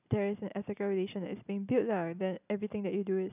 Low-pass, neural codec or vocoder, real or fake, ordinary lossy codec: 3.6 kHz; none; real; none